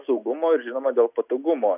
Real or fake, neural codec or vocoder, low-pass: real; none; 3.6 kHz